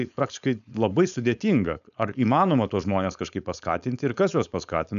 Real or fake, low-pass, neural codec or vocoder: fake; 7.2 kHz; codec, 16 kHz, 4.8 kbps, FACodec